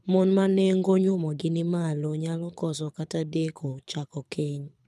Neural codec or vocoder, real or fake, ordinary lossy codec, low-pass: codec, 24 kHz, 6 kbps, HILCodec; fake; none; none